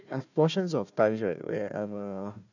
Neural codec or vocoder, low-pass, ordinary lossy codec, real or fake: codec, 16 kHz, 1 kbps, FunCodec, trained on Chinese and English, 50 frames a second; 7.2 kHz; none; fake